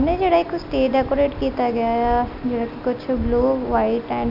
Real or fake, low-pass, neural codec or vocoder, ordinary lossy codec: real; 5.4 kHz; none; none